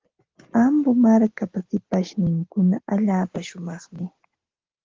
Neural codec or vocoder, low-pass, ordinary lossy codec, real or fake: none; 7.2 kHz; Opus, 16 kbps; real